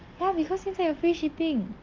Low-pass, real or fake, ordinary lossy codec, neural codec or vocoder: 7.2 kHz; real; Opus, 32 kbps; none